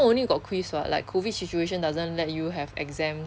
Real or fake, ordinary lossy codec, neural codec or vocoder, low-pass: real; none; none; none